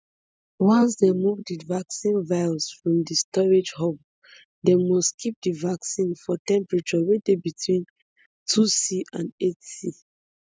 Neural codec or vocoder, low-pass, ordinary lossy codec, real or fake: none; none; none; real